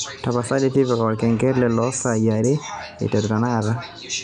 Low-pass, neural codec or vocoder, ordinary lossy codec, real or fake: 9.9 kHz; none; none; real